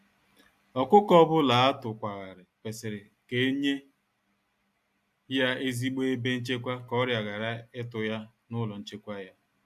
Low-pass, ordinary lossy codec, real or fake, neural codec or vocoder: 14.4 kHz; AAC, 96 kbps; real; none